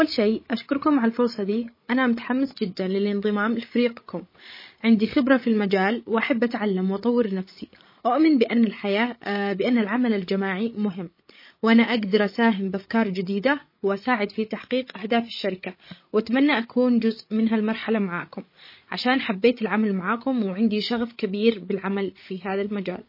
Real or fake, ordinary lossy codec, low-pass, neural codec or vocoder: fake; MP3, 24 kbps; 5.4 kHz; codec, 16 kHz, 16 kbps, FreqCodec, larger model